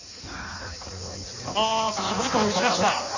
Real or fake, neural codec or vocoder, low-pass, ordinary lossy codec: fake; codec, 16 kHz in and 24 kHz out, 1.1 kbps, FireRedTTS-2 codec; 7.2 kHz; none